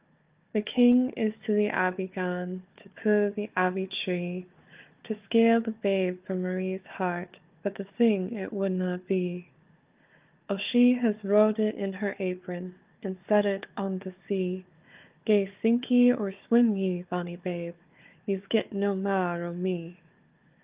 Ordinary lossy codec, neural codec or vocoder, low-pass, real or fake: Opus, 32 kbps; codec, 16 kHz, 4 kbps, FunCodec, trained on Chinese and English, 50 frames a second; 3.6 kHz; fake